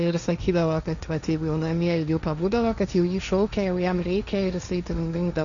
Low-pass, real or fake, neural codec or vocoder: 7.2 kHz; fake; codec, 16 kHz, 1.1 kbps, Voila-Tokenizer